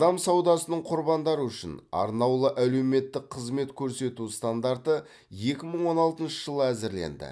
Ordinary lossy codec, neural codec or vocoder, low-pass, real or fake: none; none; none; real